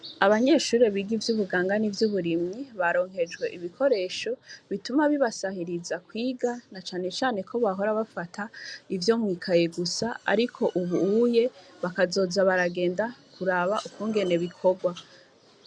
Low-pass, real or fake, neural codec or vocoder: 9.9 kHz; real; none